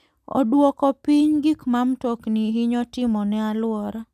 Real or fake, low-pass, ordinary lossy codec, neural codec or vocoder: real; 14.4 kHz; none; none